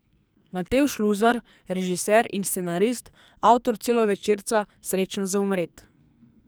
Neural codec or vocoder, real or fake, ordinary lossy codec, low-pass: codec, 44.1 kHz, 2.6 kbps, SNAC; fake; none; none